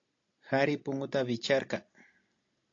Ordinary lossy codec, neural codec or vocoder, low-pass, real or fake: AAC, 48 kbps; none; 7.2 kHz; real